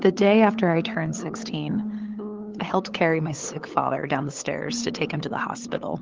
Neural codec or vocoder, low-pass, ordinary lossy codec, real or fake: codec, 16 kHz, 8 kbps, FunCodec, trained on LibriTTS, 25 frames a second; 7.2 kHz; Opus, 16 kbps; fake